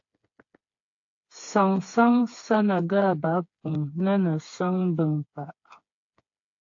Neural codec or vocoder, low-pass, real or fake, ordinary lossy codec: codec, 16 kHz, 4 kbps, FreqCodec, smaller model; 7.2 kHz; fake; AAC, 48 kbps